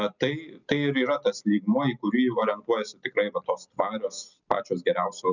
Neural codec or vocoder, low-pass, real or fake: none; 7.2 kHz; real